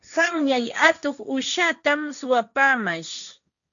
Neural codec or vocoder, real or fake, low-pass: codec, 16 kHz, 1.1 kbps, Voila-Tokenizer; fake; 7.2 kHz